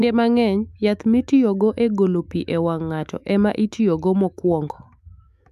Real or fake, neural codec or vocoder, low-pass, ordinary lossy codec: fake; autoencoder, 48 kHz, 128 numbers a frame, DAC-VAE, trained on Japanese speech; 14.4 kHz; MP3, 96 kbps